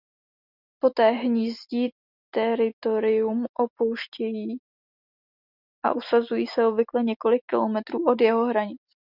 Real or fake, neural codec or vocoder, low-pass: real; none; 5.4 kHz